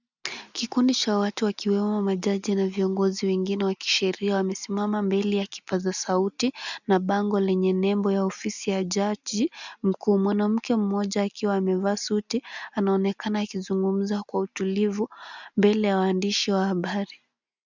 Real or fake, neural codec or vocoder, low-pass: real; none; 7.2 kHz